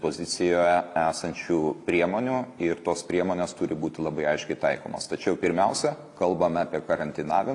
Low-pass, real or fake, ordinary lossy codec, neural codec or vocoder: 10.8 kHz; real; AAC, 48 kbps; none